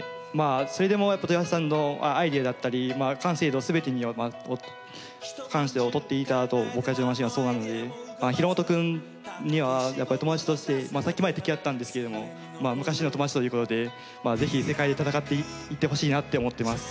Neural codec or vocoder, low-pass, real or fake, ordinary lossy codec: none; none; real; none